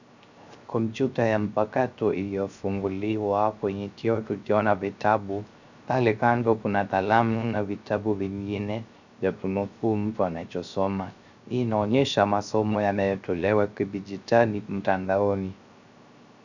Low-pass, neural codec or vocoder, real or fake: 7.2 kHz; codec, 16 kHz, 0.3 kbps, FocalCodec; fake